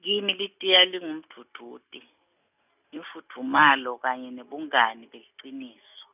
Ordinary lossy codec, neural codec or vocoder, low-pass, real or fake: none; none; 3.6 kHz; real